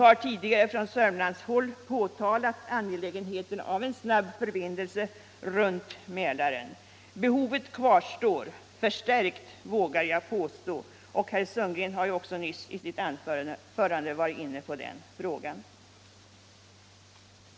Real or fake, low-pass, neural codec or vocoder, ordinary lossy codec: real; none; none; none